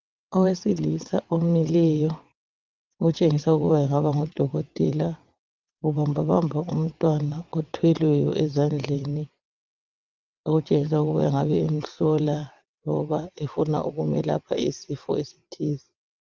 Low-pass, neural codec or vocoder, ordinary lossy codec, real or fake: 7.2 kHz; vocoder, 22.05 kHz, 80 mel bands, WaveNeXt; Opus, 24 kbps; fake